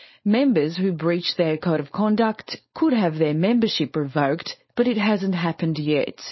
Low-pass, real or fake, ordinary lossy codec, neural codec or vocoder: 7.2 kHz; fake; MP3, 24 kbps; codec, 16 kHz, 4.8 kbps, FACodec